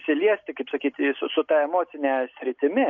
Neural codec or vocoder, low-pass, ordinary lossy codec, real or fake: none; 7.2 kHz; MP3, 48 kbps; real